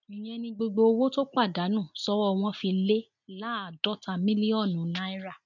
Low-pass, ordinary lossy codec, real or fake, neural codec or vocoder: 7.2 kHz; none; real; none